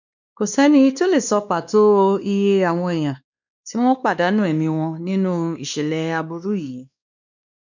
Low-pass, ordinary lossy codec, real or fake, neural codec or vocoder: 7.2 kHz; none; fake; codec, 16 kHz, 2 kbps, X-Codec, WavLM features, trained on Multilingual LibriSpeech